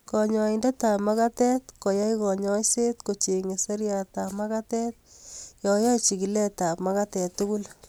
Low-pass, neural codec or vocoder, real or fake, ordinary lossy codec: none; none; real; none